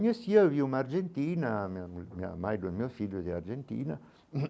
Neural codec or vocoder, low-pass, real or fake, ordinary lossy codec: none; none; real; none